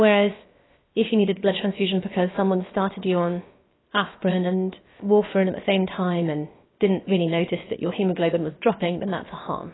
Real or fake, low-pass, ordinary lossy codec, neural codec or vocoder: fake; 7.2 kHz; AAC, 16 kbps; codec, 16 kHz, about 1 kbps, DyCAST, with the encoder's durations